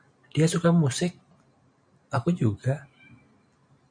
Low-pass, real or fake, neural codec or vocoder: 9.9 kHz; real; none